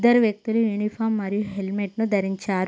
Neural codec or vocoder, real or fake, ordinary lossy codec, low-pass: none; real; none; none